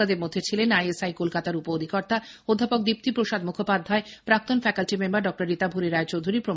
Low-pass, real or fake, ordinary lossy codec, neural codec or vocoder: none; real; none; none